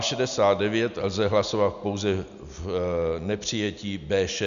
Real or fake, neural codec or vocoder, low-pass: real; none; 7.2 kHz